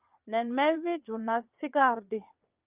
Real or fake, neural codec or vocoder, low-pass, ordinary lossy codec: fake; vocoder, 22.05 kHz, 80 mel bands, WaveNeXt; 3.6 kHz; Opus, 24 kbps